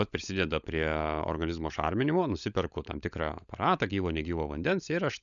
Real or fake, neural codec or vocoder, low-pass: fake; codec, 16 kHz, 16 kbps, FunCodec, trained on LibriTTS, 50 frames a second; 7.2 kHz